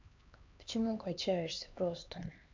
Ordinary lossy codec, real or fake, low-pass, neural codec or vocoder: MP3, 64 kbps; fake; 7.2 kHz; codec, 16 kHz, 2 kbps, X-Codec, HuBERT features, trained on LibriSpeech